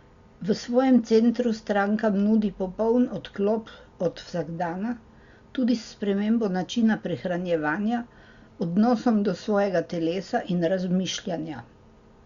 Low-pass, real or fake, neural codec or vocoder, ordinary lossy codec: 7.2 kHz; real; none; Opus, 64 kbps